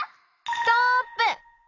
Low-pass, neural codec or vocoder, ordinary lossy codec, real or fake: 7.2 kHz; none; AAC, 48 kbps; real